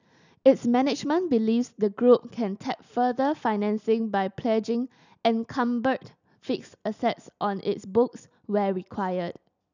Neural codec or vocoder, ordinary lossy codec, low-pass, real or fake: none; none; 7.2 kHz; real